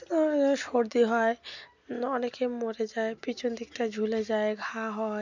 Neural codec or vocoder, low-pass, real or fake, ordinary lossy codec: none; 7.2 kHz; real; none